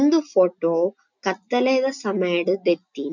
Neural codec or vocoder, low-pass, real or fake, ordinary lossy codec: none; 7.2 kHz; real; none